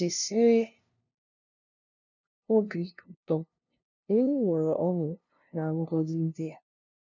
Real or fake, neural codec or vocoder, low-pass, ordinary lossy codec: fake; codec, 16 kHz, 0.5 kbps, FunCodec, trained on LibriTTS, 25 frames a second; 7.2 kHz; none